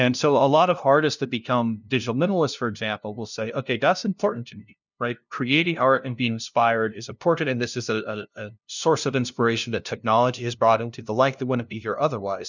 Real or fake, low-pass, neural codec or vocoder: fake; 7.2 kHz; codec, 16 kHz, 0.5 kbps, FunCodec, trained on LibriTTS, 25 frames a second